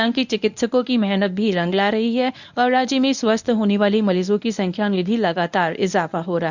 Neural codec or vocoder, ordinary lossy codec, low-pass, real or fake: codec, 24 kHz, 0.9 kbps, WavTokenizer, medium speech release version 1; none; 7.2 kHz; fake